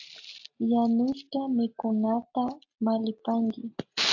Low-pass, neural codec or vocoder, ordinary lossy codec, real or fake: 7.2 kHz; none; AAC, 48 kbps; real